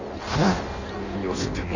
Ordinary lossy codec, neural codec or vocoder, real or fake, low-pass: Opus, 64 kbps; codec, 16 kHz in and 24 kHz out, 0.6 kbps, FireRedTTS-2 codec; fake; 7.2 kHz